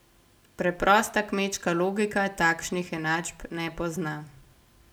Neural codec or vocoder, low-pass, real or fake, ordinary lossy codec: none; none; real; none